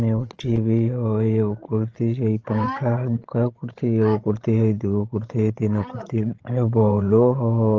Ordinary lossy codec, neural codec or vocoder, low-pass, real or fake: Opus, 16 kbps; codec, 16 kHz, 16 kbps, FreqCodec, larger model; 7.2 kHz; fake